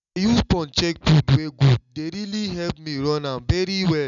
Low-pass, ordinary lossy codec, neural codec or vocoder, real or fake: 7.2 kHz; none; none; real